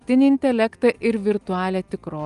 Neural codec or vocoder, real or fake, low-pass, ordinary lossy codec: none; real; 10.8 kHz; Opus, 32 kbps